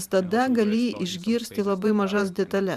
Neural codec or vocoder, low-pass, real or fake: vocoder, 44.1 kHz, 128 mel bands every 512 samples, BigVGAN v2; 14.4 kHz; fake